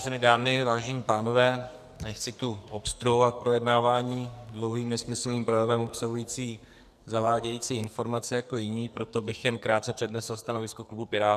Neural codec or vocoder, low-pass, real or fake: codec, 44.1 kHz, 2.6 kbps, SNAC; 14.4 kHz; fake